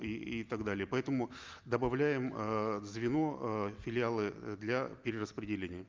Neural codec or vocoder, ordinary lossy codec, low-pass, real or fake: none; Opus, 24 kbps; 7.2 kHz; real